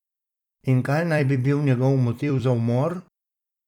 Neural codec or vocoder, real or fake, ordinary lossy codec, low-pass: vocoder, 44.1 kHz, 128 mel bands every 256 samples, BigVGAN v2; fake; none; 19.8 kHz